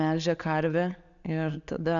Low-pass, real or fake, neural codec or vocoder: 7.2 kHz; real; none